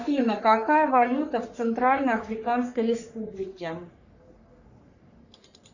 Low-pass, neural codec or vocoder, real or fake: 7.2 kHz; codec, 44.1 kHz, 3.4 kbps, Pupu-Codec; fake